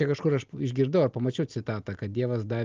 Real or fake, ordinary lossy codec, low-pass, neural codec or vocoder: real; Opus, 24 kbps; 7.2 kHz; none